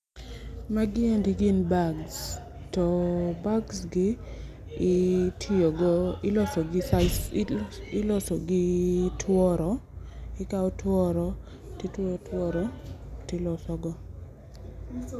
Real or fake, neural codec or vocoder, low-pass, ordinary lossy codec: real; none; 14.4 kHz; none